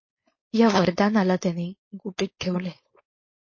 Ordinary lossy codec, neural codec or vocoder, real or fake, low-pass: MP3, 32 kbps; codec, 16 kHz, 4.8 kbps, FACodec; fake; 7.2 kHz